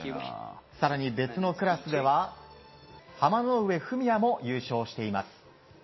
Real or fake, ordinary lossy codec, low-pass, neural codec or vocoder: real; MP3, 24 kbps; 7.2 kHz; none